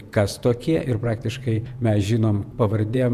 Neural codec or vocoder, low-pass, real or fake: vocoder, 48 kHz, 128 mel bands, Vocos; 14.4 kHz; fake